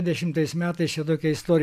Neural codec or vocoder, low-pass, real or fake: none; 14.4 kHz; real